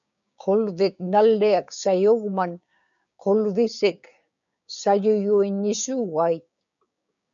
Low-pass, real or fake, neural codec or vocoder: 7.2 kHz; fake; codec, 16 kHz, 6 kbps, DAC